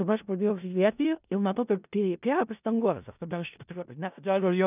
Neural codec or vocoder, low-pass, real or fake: codec, 16 kHz in and 24 kHz out, 0.4 kbps, LongCat-Audio-Codec, four codebook decoder; 3.6 kHz; fake